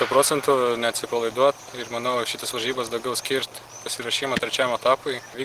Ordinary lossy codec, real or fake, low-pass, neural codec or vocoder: Opus, 16 kbps; real; 14.4 kHz; none